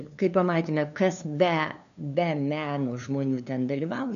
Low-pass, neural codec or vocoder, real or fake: 7.2 kHz; codec, 16 kHz, 2 kbps, FunCodec, trained on LibriTTS, 25 frames a second; fake